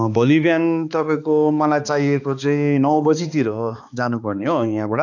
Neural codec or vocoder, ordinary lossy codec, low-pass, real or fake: codec, 16 kHz, 2 kbps, X-Codec, HuBERT features, trained on balanced general audio; none; 7.2 kHz; fake